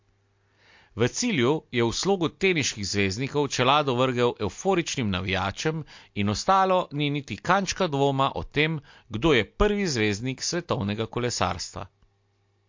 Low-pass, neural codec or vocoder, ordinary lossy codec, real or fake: 7.2 kHz; none; MP3, 48 kbps; real